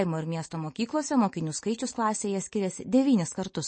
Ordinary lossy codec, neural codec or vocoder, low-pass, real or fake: MP3, 32 kbps; none; 9.9 kHz; real